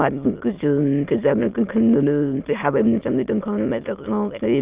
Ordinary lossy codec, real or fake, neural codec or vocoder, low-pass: Opus, 32 kbps; fake; autoencoder, 22.05 kHz, a latent of 192 numbers a frame, VITS, trained on many speakers; 3.6 kHz